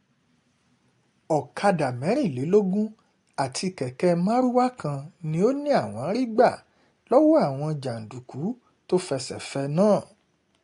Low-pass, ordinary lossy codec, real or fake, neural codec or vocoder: 10.8 kHz; AAC, 48 kbps; real; none